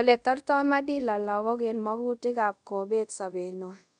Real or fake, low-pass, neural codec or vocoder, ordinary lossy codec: fake; 10.8 kHz; codec, 24 kHz, 0.5 kbps, DualCodec; none